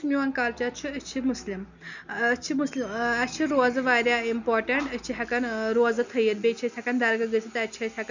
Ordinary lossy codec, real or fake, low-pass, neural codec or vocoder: none; real; 7.2 kHz; none